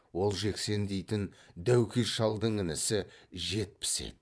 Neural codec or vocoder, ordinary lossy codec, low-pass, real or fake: vocoder, 22.05 kHz, 80 mel bands, Vocos; none; none; fake